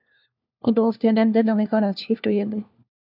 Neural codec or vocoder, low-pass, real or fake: codec, 16 kHz, 1 kbps, FunCodec, trained on LibriTTS, 50 frames a second; 5.4 kHz; fake